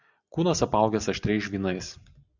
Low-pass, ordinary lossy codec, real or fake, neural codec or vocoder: 7.2 kHz; Opus, 64 kbps; real; none